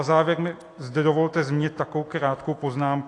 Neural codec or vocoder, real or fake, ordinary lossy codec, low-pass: none; real; AAC, 48 kbps; 10.8 kHz